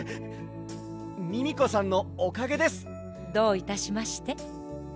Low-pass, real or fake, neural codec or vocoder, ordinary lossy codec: none; real; none; none